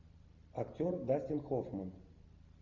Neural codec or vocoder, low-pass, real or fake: none; 7.2 kHz; real